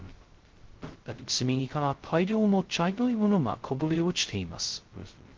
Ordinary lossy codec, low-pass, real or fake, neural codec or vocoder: Opus, 16 kbps; 7.2 kHz; fake; codec, 16 kHz, 0.2 kbps, FocalCodec